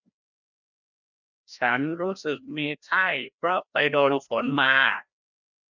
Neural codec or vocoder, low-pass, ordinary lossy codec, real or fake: codec, 16 kHz, 1 kbps, FreqCodec, larger model; 7.2 kHz; none; fake